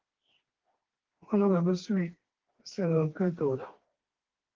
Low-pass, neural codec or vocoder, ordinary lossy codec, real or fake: 7.2 kHz; codec, 16 kHz, 2 kbps, FreqCodec, smaller model; Opus, 16 kbps; fake